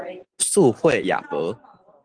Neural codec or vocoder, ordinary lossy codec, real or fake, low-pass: none; Opus, 24 kbps; real; 9.9 kHz